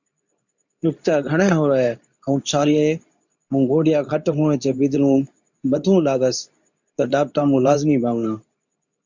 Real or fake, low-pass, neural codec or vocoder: fake; 7.2 kHz; codec, 24 kHz, 0.9 kbps, WavTokenizer, medium speech release version 2